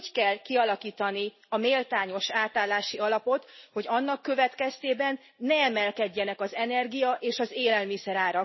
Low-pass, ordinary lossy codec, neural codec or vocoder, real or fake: 7.2 kHz; MP3, 24 kbps; none; real